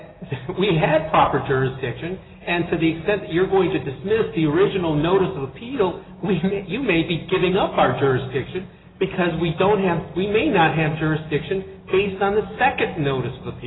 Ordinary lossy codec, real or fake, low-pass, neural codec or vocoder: AAC, 16 kbps; real; 7.2 kHz; none